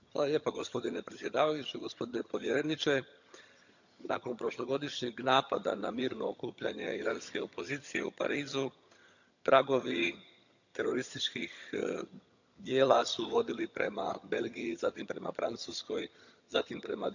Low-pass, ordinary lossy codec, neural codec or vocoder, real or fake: 7.2 kHz; none; vocoder, 22.05 kHz, 80 mel bands, HiFi-GAN; fake